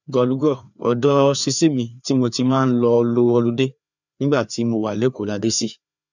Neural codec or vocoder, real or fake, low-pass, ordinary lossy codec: codec, 16 kHz, 2 kbps, FreqCodec, larger model; fake; 7.2 kHz; none